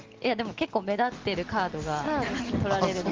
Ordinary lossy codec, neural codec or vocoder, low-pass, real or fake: Opus, 16 kbps; none; 7.2 kHz; real